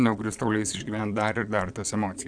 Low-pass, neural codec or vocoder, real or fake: 9.9 kHz; vocoder, 22.05 kHz, 80 mel bands, Vocos; fake